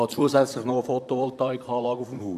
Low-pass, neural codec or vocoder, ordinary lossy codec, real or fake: 14.4 kHz; vocoder, 44.1 kHz, 128 mel bands, Pupu-Vocoder; none; fake